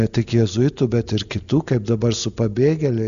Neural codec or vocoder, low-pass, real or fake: none; 7.2 kHz; real